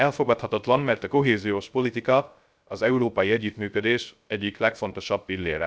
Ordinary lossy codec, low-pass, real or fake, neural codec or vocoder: none; none; fake; codec, 16 kHz, 0.3 kbps, FocalCodec